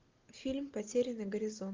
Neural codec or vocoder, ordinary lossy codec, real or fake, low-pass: none; Opus, 24 kbps; real; 7.2 kHz